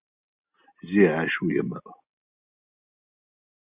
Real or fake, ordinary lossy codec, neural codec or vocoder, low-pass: real; Opus, 64 kbps; none; 3.6 kHz